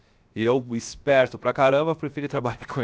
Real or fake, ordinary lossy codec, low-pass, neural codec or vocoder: fake; none; none; codec, 16 kHz, 0.7 kbps, FocalCodec